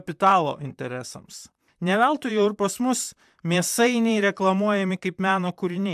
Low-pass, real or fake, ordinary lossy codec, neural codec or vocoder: 14.4 kHz; fake; AAC, 96 kbps; vocoder, 44.1 kHz, 128 mel bands, Pupu-Vocoder